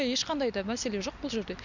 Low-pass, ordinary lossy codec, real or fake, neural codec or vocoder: 7.2 kHz; none; real; none